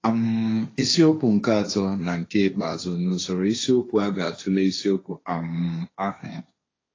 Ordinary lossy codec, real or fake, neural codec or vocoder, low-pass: AAC, 32 kbps; fake; codec, 16 kHz, 1.1 kbps, Voila-Tokenizer; 7.2 kHz